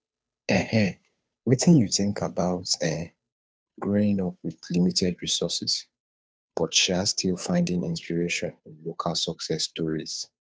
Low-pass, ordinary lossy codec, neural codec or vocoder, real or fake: none; none; codec, 16 kHz, 2 kbps, FunCodec, trained on Chinese and English, 25 frames a second; fake